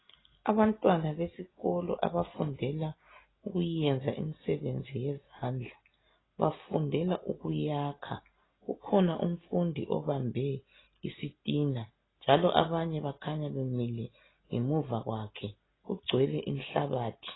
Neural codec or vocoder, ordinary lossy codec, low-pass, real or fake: none; AAC, 16 kbps; 7.2 kHz; real